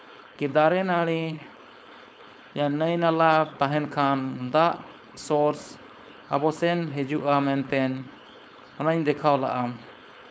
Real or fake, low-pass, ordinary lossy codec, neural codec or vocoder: fake; none; none; codec, 16 kHz, 4.8 kbps, FACodec